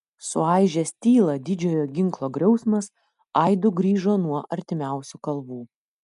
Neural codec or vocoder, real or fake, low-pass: none; real; 10.8 kHz